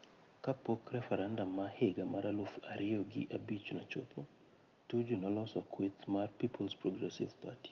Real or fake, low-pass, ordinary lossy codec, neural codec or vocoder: real; 7.2 kHz; Opus, 32 kbps; none